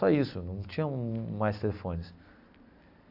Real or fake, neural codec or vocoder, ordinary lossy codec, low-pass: real; none; AAC, 48 kbps; 5.4 kHz